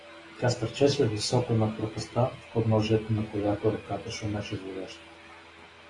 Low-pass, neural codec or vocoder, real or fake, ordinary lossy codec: 10.8 kHz; none; real; AAC, 32 kbps